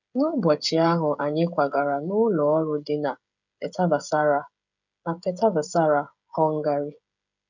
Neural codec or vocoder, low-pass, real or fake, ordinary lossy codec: codec, 16 kHz, 16 kbps, FreqCodec, smaller model; 7.2 kHz; fake; none